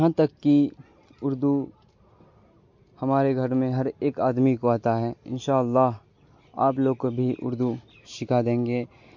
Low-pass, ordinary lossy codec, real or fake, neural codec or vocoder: 7.2 kHz; MP3, 48 kbps; real; none